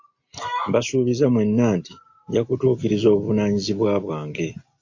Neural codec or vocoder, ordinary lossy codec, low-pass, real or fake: none; AAC, 48 kbps; 7.2 kHz; real